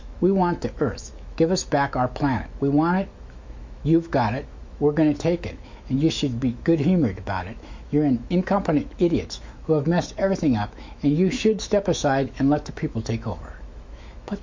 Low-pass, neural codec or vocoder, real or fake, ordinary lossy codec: 7.2 kHz; autoencoder, 48 kHz, 128 numbers a frame, DAC-VAE, trained on Japanese speech; fake; MP3, 48 kbps